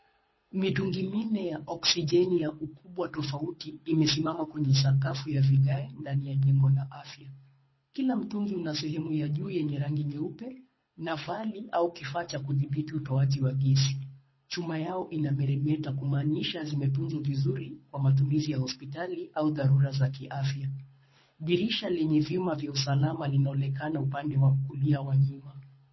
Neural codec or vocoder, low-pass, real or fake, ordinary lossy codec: codec, 24 kHz, 6 kbps, HILCodec; 7.2 kHz; fake; MP3, 24 kbps